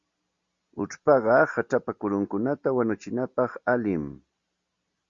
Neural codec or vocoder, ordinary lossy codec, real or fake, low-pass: none; Opus, 64 kbps; real; 7.2 kHz